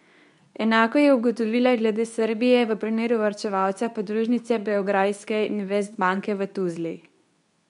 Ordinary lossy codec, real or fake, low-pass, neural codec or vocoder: none; fake; 10.8 kHz; codec, 24 kHz, 0.9 kbps, WavTokenizer, medium speech release version 2